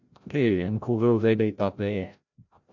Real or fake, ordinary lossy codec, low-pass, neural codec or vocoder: fake; MP3, 64 kbps; 7.2 kHz; codec, 16 kHz, 0.5 kbps, FreqCodec, larger model